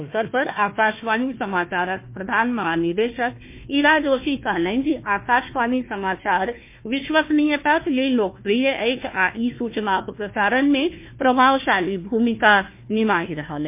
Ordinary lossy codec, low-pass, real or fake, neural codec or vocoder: MP3, 24 kbps; 3.6 kHz; fake; codec, 16 kHz, 1 kbps, FunCodec, trained on Chinese and English, 50 frames a second